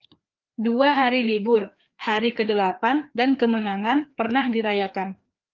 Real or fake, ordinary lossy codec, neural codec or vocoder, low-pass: fake; Opus, 32 kbps; codec, 16 kHz, 2 kbps, FreqCodec, larger model; 7.2 kHz